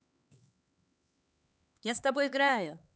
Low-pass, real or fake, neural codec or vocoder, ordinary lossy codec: none; fake; codec, 16 kHz, 4 kbps, X-Codec, HuBERT features, trained on LibriSpeech; none